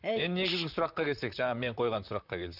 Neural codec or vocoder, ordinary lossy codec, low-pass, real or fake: vocoder, 44.1 kHz, 128 mel bands, Pupu-Vocoder; none; 5.4 kHz; fake